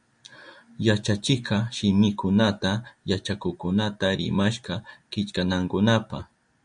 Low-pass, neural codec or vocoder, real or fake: 9.9 kHz; none; real